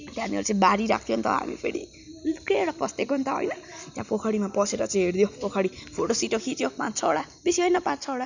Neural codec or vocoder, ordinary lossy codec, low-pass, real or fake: none; none; 7.2 kHz; real